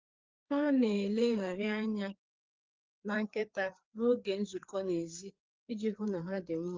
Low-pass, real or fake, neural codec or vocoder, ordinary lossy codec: 7.2 kHz; fake; codec, 16 kHz, 4 kbps, FreqCodec, larger model; Opus, 24 kbps